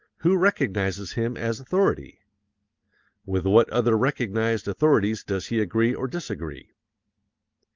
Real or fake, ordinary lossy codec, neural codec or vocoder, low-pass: real; Opus, 32 kbps; none; 7.2 kHz